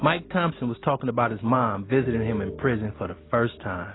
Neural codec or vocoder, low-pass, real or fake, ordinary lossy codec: none; 7.2 kHz; real; AAC, 16 kbps